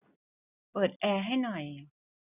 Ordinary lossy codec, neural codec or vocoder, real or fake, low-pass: none; none; real; 3.6 kHz